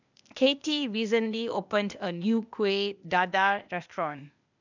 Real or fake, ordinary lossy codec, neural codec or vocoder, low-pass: fake; none; codec, 16 kHz, 0.8 kbps, ZipCodec; 7.2 kHz